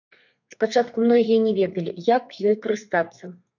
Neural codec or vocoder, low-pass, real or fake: codec, 32 kHz, 1.9 kbps, SNAC; 7.2 kHz; fake